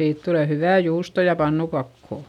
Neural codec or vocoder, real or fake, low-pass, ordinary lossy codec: none; real; 19.8 kHz; none